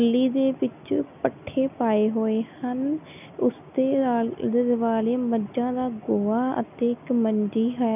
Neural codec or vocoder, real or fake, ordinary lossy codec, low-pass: none; real; none; 3.6 kHz